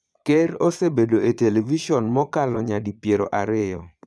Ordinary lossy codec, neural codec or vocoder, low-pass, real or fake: none; vocoder, 22.05 kHz, 80 mel bands, Vocos; none; fake